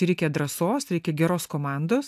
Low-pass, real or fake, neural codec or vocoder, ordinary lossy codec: 14.4 kHz; real; none; AAC, 96 kbps